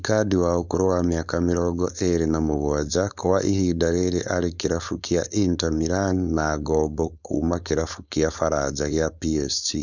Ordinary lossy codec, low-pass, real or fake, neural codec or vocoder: none; 7.2 kHz; fake; codec, 16 kHz, 4.8 kbps, FACodec